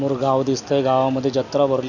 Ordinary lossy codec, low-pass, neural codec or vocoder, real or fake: none; 7.2 kHz; none; real